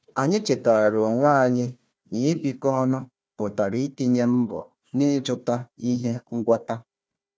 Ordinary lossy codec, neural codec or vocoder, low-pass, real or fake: none; codec, 16 kHz, 1 kbps, FunCodec, trained on Chinese and English, 50 frames a second; none; fake